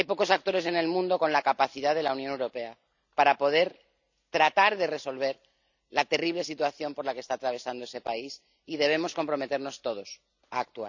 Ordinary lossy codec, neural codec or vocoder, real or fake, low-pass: none; none; real; 7.2 kHz